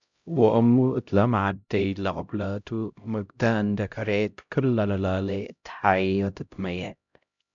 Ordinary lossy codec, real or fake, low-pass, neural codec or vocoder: MP3, 64 kbps; fake; 7.2 kHz; codec, 16 kHz, 0.5 kbps, X-Codec, HuBERT features, trained on LibriSpeech